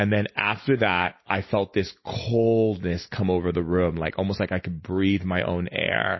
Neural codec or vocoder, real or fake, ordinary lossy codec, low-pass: none; real; MP3, 24 kbps; 7.2 kHz